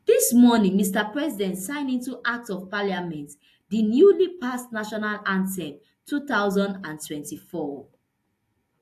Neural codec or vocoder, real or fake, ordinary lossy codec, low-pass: none; real; AAC, 64 kbps; 14.4 kHz